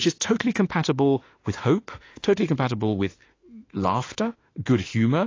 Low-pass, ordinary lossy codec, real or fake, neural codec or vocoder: 7.2 kHz; AAC, 32 kbps; fake; autoencoder, 48 kHz, 32 numbers a frame, DAC-VAE, trained on Japanese speech